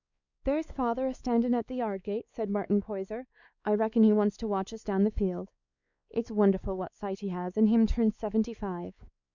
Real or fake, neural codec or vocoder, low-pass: fake; codec, 16 kHz, 4 kbps, X-Codec, WavLM features, trained on Multilingual LibriSpeech; 7.2 kHz